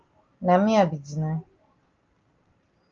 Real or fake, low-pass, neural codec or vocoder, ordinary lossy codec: real; 7.2 kHz; none; Opus, 24 kbps